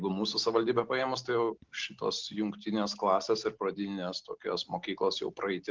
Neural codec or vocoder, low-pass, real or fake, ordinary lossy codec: none; 7.2 kHz; real; Opus, 32 kbps